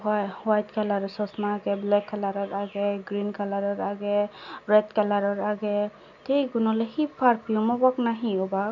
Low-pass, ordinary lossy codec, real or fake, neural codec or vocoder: 7.2 kHz; none; real; none